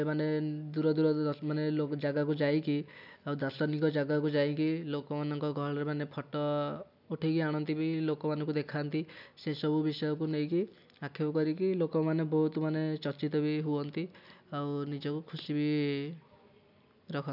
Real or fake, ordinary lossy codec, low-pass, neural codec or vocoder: real; none; 5.4 kHz; none